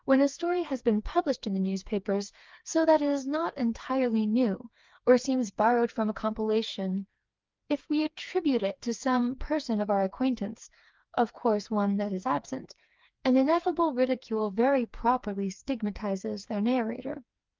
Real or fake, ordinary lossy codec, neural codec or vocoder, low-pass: fake; Opus, 32 kbps; codec, 16 kHz, 4 kbps, FreqCodec, smaller model; 7.2 kHz